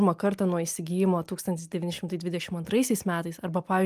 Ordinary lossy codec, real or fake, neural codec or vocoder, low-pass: Opus, 32 kbps; real; none; 14.4 kHz